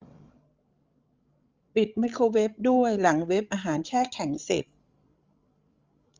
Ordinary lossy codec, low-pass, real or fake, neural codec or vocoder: Opus, 32 kbps; 7.2 kHz; fake; codec, 16 kHz, 16 kbps, FreqCodec, larger model